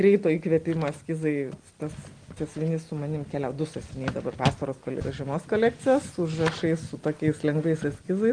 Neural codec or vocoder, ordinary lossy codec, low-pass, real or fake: none; Opus, 24 kbps; 9.9 kHz; real